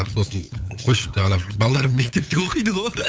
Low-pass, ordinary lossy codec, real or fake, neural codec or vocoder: none; none; fake; codec, 16 kHz, 8 kbps, FunCodec, trained on LibriTTS, 25 frames a second